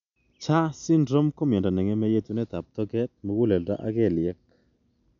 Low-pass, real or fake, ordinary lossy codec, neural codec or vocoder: 7.2 kHz; real; none; none